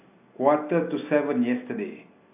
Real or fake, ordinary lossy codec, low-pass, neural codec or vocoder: real; AAC, 24 kbps; 3.6 kHz; none